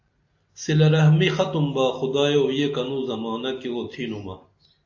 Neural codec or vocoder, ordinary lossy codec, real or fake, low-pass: none; AAC, 48 kbps; real; 7.2 kHz